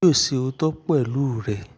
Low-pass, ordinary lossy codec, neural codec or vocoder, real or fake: none; none; none; real